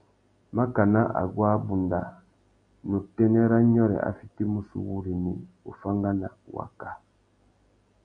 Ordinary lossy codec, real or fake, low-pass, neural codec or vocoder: AAC, 48 kbps; real; 9.9 kHz; none